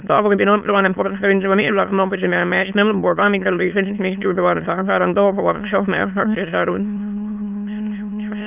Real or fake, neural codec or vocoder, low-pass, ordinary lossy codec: fake; autoencoder, 22.05 kHz, a latent of 192 numbers a frame, VITS, trained on many speakers; 3.6 kHz; none